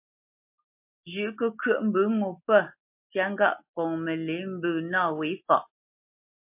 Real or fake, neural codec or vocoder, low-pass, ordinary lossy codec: real; none; 3.6 kHz; MP3, 32 kbps